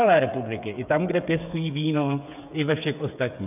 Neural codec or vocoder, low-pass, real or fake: codec, 16 kHz, 8 kbps, FreqCodec, smaller model; 3.6 kHz; fake